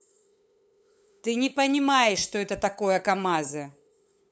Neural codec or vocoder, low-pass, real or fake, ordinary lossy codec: codec, 16 kHz, 8 kbps, FunCodec, trained on LibriTTS, 25 frames a second; none; fake; none